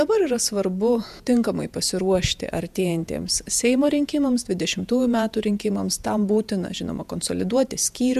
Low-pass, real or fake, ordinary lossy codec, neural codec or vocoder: 14.4 kHz; fake; MP3, 96 kbps; vocoder, 48 kHz, 128 mel bands, Vocos